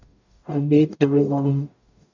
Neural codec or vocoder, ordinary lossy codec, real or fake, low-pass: codec, 44.1 kHz, 0.9 kbps, DAC; none; fake; 7.2 kHz